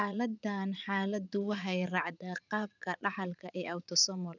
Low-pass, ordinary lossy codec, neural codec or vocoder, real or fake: 7.2 kHz; none; vocoder, 44.1 kHz, 80 mel bands, Vocos; fake